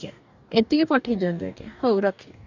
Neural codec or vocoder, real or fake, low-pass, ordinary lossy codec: codec, 44.1 kHz, 2.6 kbps, DAC; fake; 7.2 kHz; none